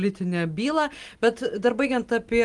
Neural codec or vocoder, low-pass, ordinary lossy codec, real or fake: none; 10.8 kHz; Opus, 24 kbps; real